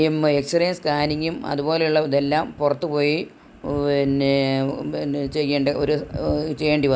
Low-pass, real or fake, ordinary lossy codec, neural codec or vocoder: none; real; none; none